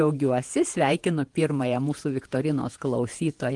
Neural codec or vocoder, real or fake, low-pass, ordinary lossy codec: vocoder, 48 kHz, 128 mel bands, Vocos; fake; 10.8 kHz; Opus, 24 kbps